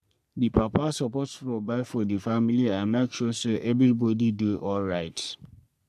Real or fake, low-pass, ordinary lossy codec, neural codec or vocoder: fake; 14.4 kHz; none; codec, 44.1 kHz, 3.4 kbps, Pupu-Codec